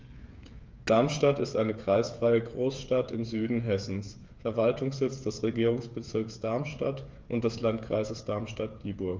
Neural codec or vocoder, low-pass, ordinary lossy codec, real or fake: codec, 16 kHz, 8 kbps, FreqCodec, smaller model; 7.2 kHz; Opus, 24 kbps; fake